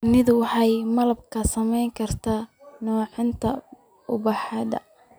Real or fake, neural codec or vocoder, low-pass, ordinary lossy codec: real; none; none; none